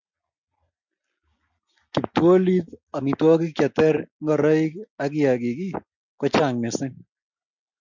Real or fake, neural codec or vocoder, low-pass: real; none; 7.2 kHz